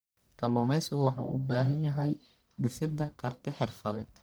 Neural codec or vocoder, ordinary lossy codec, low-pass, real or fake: codec, 44.1 kHz, 1.7 kbps, Pupu-Codec; none; none; fake